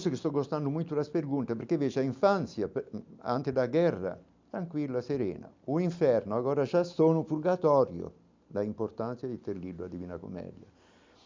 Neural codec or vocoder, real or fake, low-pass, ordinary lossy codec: none; real; 7.2 kHz; MP3, 64 kbps